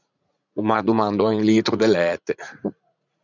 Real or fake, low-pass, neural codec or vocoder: fake; 7.2 kHz; vocoder, 44.1 kHz, 80 mel bands, Vocos